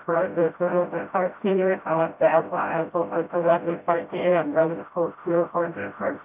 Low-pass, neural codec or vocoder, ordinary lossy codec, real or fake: 3.6 kHz; codec, 16 kHz, 0.5 kbps, FreqCodec, smaller model; AAC, 32 kbps; fake